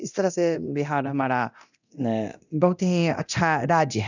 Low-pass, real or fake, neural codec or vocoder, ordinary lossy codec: 7.2 kHz; fake; codec, 24 kHz, 0.9 kbps, DualCodec; none